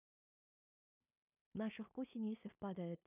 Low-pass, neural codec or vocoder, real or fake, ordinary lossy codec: 3.6 kHz; codec, 16 kHz in and 24 kHz out, 0.4 kbps, LongCat-Audio-Codec, two codebook decoder; fake; none